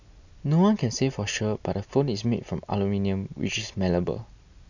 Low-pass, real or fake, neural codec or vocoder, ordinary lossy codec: 7.2 kHz; real; none; none